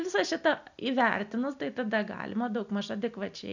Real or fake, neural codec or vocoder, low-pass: real; none; 7.2 kHz